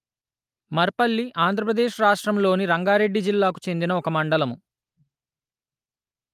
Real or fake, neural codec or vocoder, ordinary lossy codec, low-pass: real; none; Opus, 32 kbps; 14.4 kHz